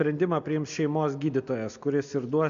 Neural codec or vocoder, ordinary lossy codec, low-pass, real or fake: none; AAC, 64 kbps; 7.2 kHz; real